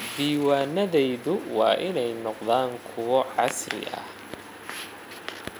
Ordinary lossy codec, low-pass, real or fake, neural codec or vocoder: none; none; real; none